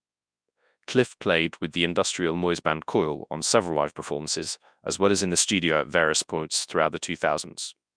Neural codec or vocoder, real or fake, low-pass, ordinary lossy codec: codec, 24 kHz, 0.9 kbps, WavTokenizer, large speech release; fake; 9.9 kHz; MP3, 96 kbps